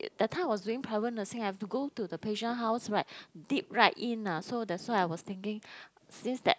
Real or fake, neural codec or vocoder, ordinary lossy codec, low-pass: real; none; none; none